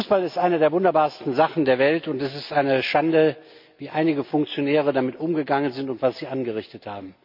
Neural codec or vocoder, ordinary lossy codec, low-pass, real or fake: none; MP3, 48 kbps; 5.4 kHz; real